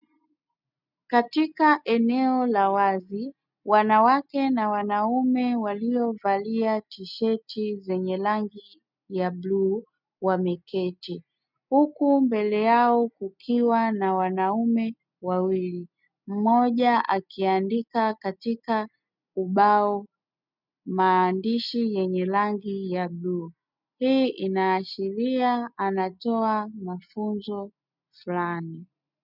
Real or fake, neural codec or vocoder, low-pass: real; none; 5.4 kHz